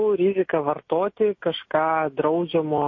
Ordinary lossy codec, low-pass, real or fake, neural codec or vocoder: MP3, 32 kbps; 7.2 kHz; real; none